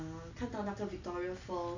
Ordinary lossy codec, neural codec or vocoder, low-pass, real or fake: none; none; 7.2 kHz; real